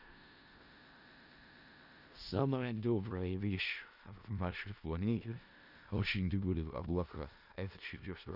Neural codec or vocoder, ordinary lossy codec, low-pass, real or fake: codec, 16 kHz in and 24 kHz out, 0.4 kbps, LongCat-Audio-Codec, four codebook decoder; none; 5.4 kHz; fake